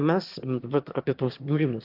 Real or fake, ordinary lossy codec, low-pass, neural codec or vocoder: fake; Opus, 32 kbps; 5.4 kHz; autoencoder, 22.05 kHz, a latent of 192 numbers a frame, VITS, trained on one speaker